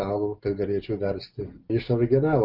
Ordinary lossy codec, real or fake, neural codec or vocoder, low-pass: Opus, 32 kbps; real; none; 5.4 kHz